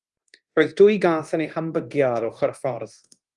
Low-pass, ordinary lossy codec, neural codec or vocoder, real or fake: 10.8 kHz; Opus, 32 kbps; codec, 24 kHz, 0.9 kbps, DualCodec; fake